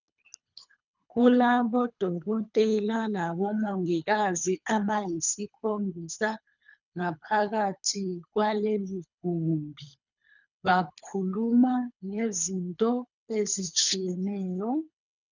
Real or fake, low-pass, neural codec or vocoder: fake; 7.2 kHz; codec, 24 kHz, 3 kbps, HILCodec